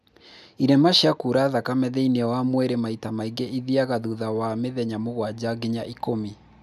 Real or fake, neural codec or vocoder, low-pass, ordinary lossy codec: real; none; 14.4 kHz; none